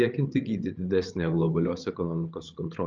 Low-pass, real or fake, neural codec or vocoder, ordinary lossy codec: 7.2 kHz; fake; codec, 16 kHz, 8 kbps, FreqCodec, larger model; Opus, 24 kbps